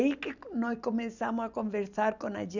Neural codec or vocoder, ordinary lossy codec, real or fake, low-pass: none; none; real; 7.2 kHz